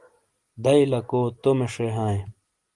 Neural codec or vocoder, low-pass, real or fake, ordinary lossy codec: none; 10.8 kHz; real; Opus, 24 kbps